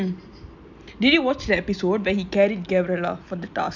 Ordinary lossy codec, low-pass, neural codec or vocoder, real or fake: none; 7.2 kHz; none; real